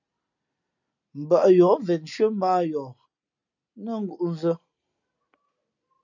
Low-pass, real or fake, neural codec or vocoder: 7.2 kHz; real; none